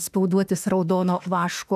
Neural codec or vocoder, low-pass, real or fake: autoencoder, 48 kHz, 32 numbers a frame, DAC-VAE, trained on Japanese speech; 14.4 kHz; fake